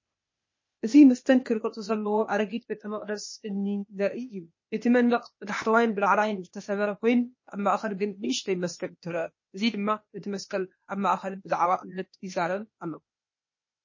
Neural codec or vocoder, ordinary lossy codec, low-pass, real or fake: codec, 16 kHz, 0.8 kbps, ZipCodec; MP3, 32 kbps; 7.2 kHz; fake